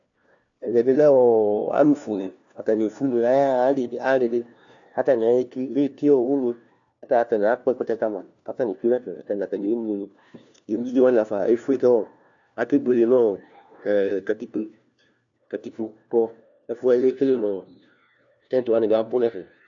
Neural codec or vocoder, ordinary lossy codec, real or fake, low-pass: codec, 16 kHz, 1 kbps, FunCodec, trained on LibriTTS, 50 frames a second; AAC, 64 kbps; fake; 7.2 kHz